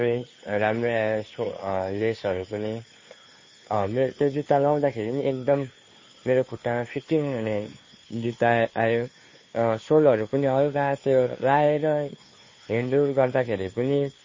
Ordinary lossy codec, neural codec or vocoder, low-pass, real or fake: MP3, 32 kbps; codec, 16 kHz, 2 kbps, FunCodec, trained on Chinese and English, 25 frames a second; 7.2 kHz; fake